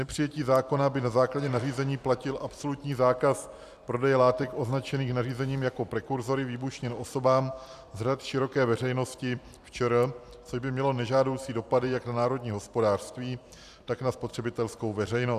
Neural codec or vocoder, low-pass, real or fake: vocoder, 44.1 kHz, 128 mel bands every 256 samples, BigVGAN v2; 14.4 kHz; fake